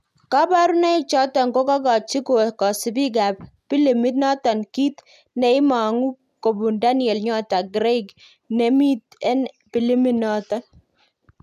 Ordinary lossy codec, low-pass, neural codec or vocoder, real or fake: none; 14.4 kHz; none; real